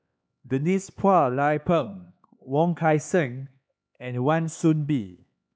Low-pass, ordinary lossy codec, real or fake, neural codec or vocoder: none; none; fake; codec, 16 kHz, 4 kbps, X-Codec, HuBERT features, trained on LibriSpeech